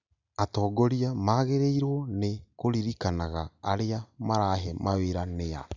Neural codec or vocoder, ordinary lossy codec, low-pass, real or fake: none; none; 7.2 kHz; real